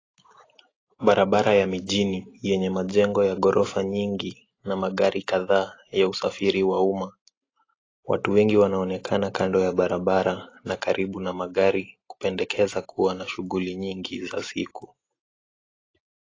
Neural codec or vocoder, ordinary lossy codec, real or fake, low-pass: none; AAC, 32 kbps; real; 7.2 kHz